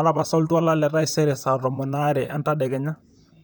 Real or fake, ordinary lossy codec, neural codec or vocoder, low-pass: fake; none; vocoder, 44.1 kHz, 128 mel bands, Pupu-Vocoder; none